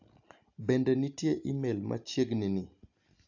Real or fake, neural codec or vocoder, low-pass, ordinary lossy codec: real; none; 7.2 kHz; AAC, 48 kbps